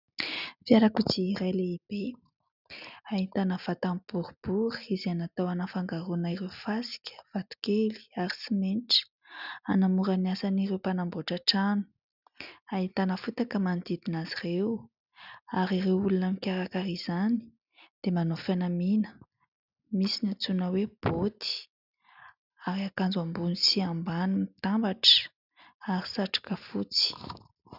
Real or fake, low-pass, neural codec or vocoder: real; 5.4 kHz; none